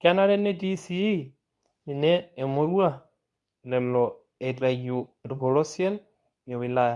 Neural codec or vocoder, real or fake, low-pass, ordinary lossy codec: codec, 24 kHz, 0.9 kbps, WavTokenizer, medium speech release version 2; fake; 10.8 kHz; none